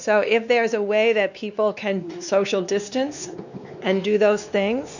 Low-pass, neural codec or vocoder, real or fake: 7.2 kHz; codec, 16 kHz, 2 kbps, X-Codec, WavLM features, trained on Multilingual LibriSpeech; fake